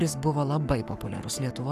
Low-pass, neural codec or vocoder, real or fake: 14.4 kHz; codec, 44.1 kHz, 7.8 kbps, Pupu-Codec; fake